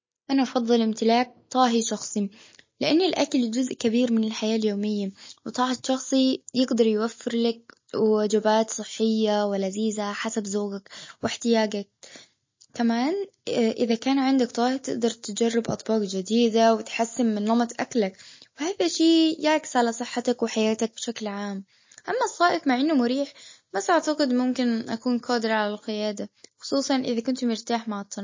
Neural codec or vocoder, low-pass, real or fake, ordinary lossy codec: none; 7.2 kHz; real; MP3, 32 kbps